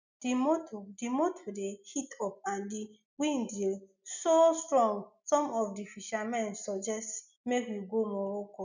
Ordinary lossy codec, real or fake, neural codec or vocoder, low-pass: none; real; none; 7.2 kHz